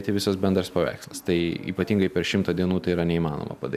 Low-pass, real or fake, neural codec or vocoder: 14.4 kHz; real; none